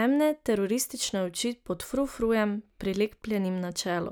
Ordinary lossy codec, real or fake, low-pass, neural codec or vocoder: none; real; none; none